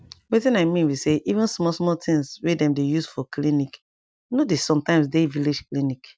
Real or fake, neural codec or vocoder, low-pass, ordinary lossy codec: real; none; none; none